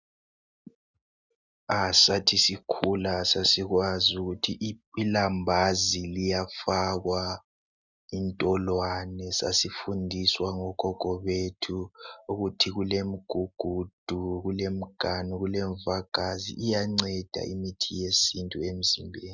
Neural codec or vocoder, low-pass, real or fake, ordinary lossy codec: none; 7.2 kHz; real; Opus, 64 kbps